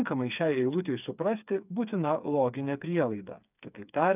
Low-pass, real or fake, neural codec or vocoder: 3.6 kHz; fake; codec, 16 kHz, 4 kbps, FreqCodec, smaller model